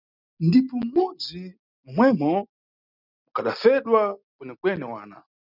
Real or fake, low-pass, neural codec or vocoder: real; 5.4 kHz; none